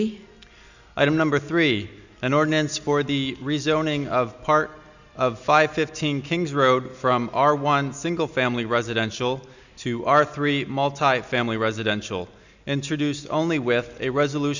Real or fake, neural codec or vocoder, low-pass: real; none; 7.2 kHz